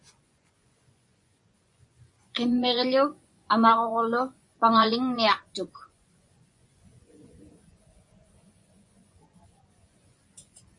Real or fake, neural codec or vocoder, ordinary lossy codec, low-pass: fake; vocoder, 24 kHz, 100 mel bands, Vocos; MP3, 48 kbps; 10.8 kHz